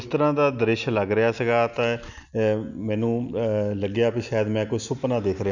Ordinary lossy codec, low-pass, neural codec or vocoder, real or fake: none; 7.2 kHz; none; real